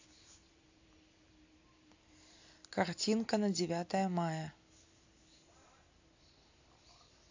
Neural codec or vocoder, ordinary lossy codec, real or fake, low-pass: none; AAC, 48 kbps; real; 7.2 kHz